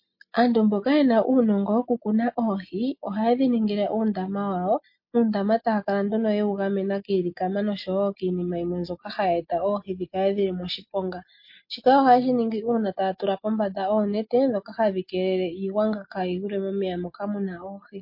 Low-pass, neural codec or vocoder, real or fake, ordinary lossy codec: 5.4 kHz; none; real; MP3, 32 kbps